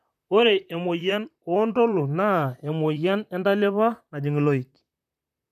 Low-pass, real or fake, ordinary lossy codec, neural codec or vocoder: 14.4 kHz; fake; none; vocoder, 44.1 kHz, 128 mel bands, Pupu-Vocoder